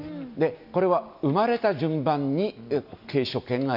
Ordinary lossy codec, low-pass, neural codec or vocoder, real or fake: none; 5.4 kHz; none; real